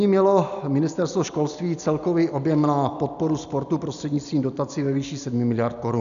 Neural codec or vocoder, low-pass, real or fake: none; 7.2 kHz; real